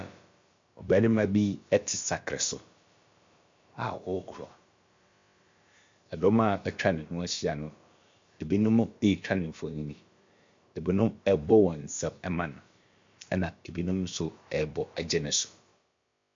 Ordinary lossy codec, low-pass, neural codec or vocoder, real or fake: MP3, 48 kbps; 7.2 kHz; codec, 16 kHz, about 1 kbps, DyCAST, with the encoder's durations; fake